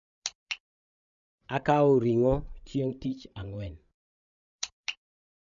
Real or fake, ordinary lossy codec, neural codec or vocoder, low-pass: fake; none; codec, 16 kHz, 16 kbps, FreqCodec, larger model; 7.2 kHz